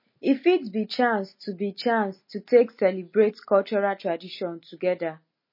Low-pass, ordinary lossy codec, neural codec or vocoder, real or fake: 5.4 kHz; MP3, 24 kbps; none; real